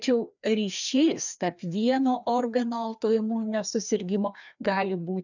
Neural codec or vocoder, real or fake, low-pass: codec, 16 kHz, 2 kbps, FreqCodec, larger model; fake; 7.2 kHz